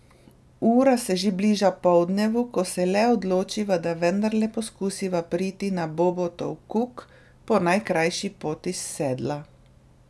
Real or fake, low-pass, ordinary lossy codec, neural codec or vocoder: real; none; none; none